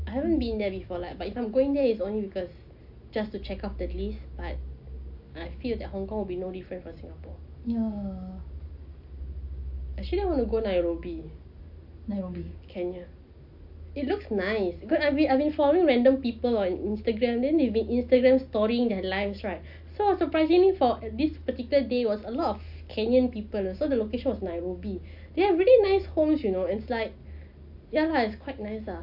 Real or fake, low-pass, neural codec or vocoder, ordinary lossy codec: real; 5.4 kHz; none; AAC, 48 kbps